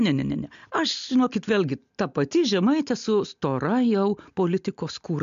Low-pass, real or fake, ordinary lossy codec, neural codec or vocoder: 7.2 kHz; real; MP3, 64 kbps; none